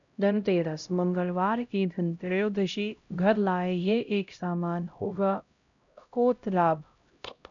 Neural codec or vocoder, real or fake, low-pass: codec, 16 kHz, 0.5 kbps, X-Codec, HuBERT features, trained on LibriSpeech; fake; 7.2 kHz